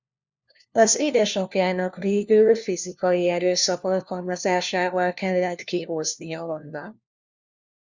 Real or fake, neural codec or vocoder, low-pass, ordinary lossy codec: fake; codec, 16 kHz, 1 kbps, FunCodec, trained on LibriTTS, 50 frames a second; 7.2 kHz; Opus, 64 kbps